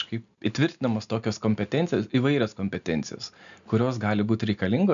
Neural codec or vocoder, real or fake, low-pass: none; real; 7.2 kHz